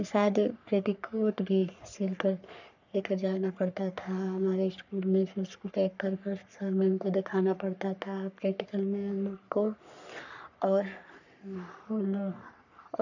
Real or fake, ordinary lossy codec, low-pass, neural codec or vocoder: fake; none; 7.2 kHz; codec, 44.1 kHz, 3.4 kbps, Pupu-Codec